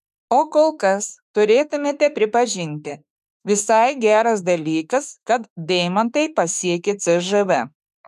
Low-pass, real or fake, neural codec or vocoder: 14.4 kHz; fake; autoencoder, 48 kHz, 32 numbers a frame, DAC-VAE, trained on Japanese speech